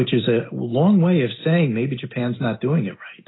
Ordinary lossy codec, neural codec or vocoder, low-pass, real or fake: AAC, 16 kbps; none; 7.2 kHz; real